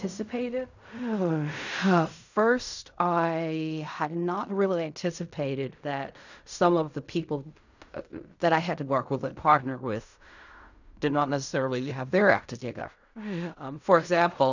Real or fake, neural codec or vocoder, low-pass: fake; codec, 16 kHz in and 24 kHz out, 0.4 kbps, LongCat-Audio-Codec, fine tuned four codebook decoder; 7.2 kHz